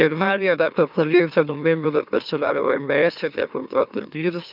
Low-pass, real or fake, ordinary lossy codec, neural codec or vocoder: 5.4 kHz; fake; none; autoencoder, 44.1 kHz, a latent of 192 numbers a frame, MeloTTS